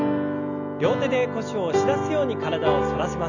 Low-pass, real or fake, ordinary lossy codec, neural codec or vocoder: 7.2 kHz; real; none; none